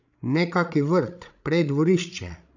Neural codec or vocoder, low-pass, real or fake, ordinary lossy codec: codec, 16 kHz, 16 kbps, FreqCodec, larger model; 7.2 kHz; fake; none